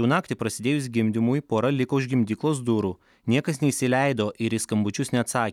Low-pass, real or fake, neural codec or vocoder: 19.8 kHz; real; none